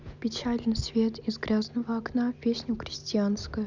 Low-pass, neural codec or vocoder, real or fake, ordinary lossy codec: 7.2 kHz; none; real; none